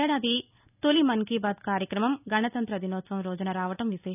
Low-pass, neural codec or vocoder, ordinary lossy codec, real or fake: 3.6 kHz; none; none; real